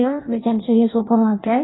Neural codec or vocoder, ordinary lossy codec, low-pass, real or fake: codec, 16 kHz in and 24 kHz out, 0.6 kbps, FireRedTTS-2 codec; AAC, 16 kbps; 7.2 kHz; fake